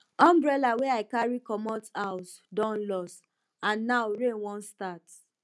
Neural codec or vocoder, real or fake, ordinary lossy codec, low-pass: none; real; none; none